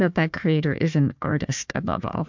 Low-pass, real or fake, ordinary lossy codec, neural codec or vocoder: 7.2 kHz; fake; MP3, 64 kbps; codec, 16 kHz, 1 kbps, FunCodec, trained on Chinese and English, 50 frames a second